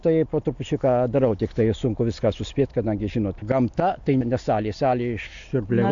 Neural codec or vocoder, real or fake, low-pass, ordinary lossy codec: none; real; 7.2 kHz; AAC, 64 kbps